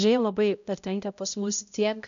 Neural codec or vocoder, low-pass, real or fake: codec, 16 kHz, 0.5 kbps, X-Codec, HuBERT features, trained on balanced general audio; 7.2 kHz; fake